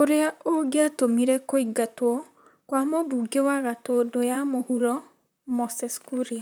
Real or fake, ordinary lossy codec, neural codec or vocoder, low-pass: fake; none; vocoder, 44.1 kHz, 128 mel bands, Pupu-Vocoder; none